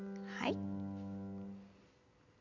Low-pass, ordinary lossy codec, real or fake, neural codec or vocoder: 7.2 kHz; none; real; none